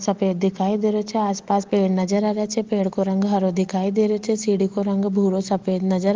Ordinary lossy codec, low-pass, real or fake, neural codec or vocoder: Opus, 16 kbps; 7.2 kHz; real; none